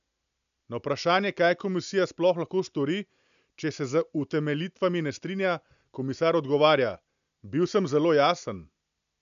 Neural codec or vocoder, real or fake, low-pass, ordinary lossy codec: none; real; 7.2 kHz; none